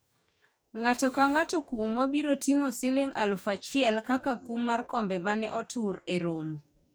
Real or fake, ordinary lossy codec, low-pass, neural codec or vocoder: fake; none; none; codec, 44.1 kHz, 2.6 kbps, DAC